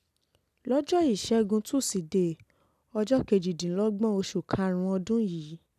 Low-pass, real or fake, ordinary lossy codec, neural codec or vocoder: 14.4 kHz; real; none; none